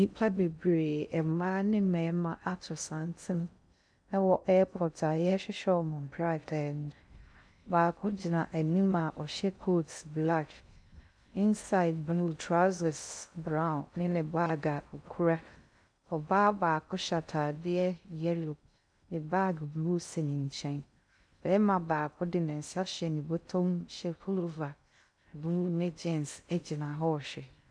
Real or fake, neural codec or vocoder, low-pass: fake; codec, 16 kHz in and 24 kHz out, 0.6 kbps, FocalCodec, streaming, 2048 codes; 9.9 kHz